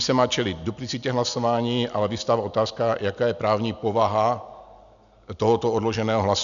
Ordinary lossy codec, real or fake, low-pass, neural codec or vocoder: MP3, 96 kbps; real; 7.2 kHz; none